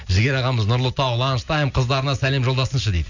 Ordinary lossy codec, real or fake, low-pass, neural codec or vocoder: none; real; 7.2 kHz; none